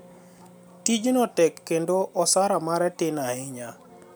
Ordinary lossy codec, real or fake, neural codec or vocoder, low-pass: none; real; none; none